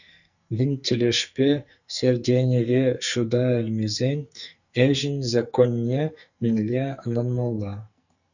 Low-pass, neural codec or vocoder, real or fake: 7.2 kHz; codec, 44.1 kHz, 2.6 kbps, SNAC; fake